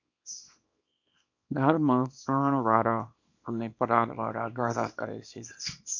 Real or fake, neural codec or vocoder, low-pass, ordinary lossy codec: fake; codec, 24 kHz, 0.9 kbps, WavTokenizer, small release; 7.2 kHz; MP3, 64 kbps